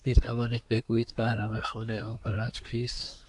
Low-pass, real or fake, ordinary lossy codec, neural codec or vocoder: 10.8 kHz; fake; AAC, 64 kbps; codec, 24 kHz, 1 kbps, SNAC